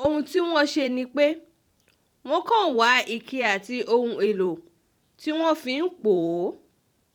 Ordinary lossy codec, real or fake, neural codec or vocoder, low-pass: none; fake; vocoder, 44.1 kHz, 128 mel bands every 512 samples, BigVGAN v2; 19.8 kHz